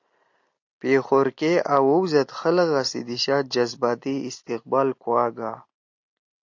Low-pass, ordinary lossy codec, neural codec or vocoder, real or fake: 7.2 kHz; AAC, 48 kbps; none; real